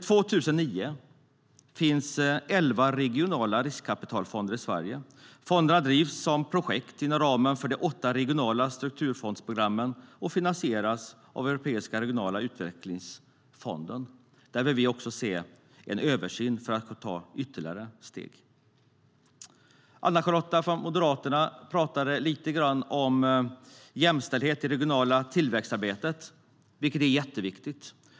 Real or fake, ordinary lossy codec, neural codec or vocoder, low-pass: real; none; none; none